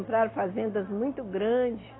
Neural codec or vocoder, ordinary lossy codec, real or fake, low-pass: none; AAC, 16 kbps; real; 7.2 kHz